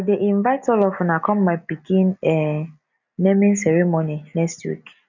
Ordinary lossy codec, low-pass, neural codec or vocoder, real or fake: none; 7.2 kHz; none; real